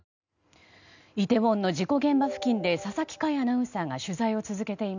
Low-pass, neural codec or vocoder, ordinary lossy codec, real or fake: 7.2 kHz; none; none; real